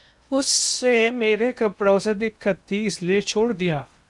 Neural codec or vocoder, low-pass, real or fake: codec, 16 kHz in and 24 kHz out, 0.8 kbps, FocalCodec, streaming, 65536 codes; 10.8 kHz; fake